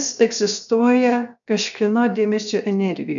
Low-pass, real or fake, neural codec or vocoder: 7.2 kHz; fake; codec, 16 kHz, 0.7 kbps, FocalCodec